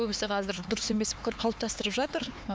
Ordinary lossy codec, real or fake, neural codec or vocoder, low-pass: none; fake; codec, 16 kHz, 4 kbps, X-Codec, HuBERT features, trained on LibriSpeech; none